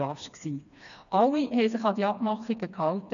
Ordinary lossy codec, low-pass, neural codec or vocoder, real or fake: none; 7.2 kHz; codec, 16 kHz, 2 kbps, FreqCodec, smaller model; fake